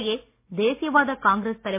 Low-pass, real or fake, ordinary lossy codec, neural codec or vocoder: 3.6 kHz; real; MP3, 24 kbps; none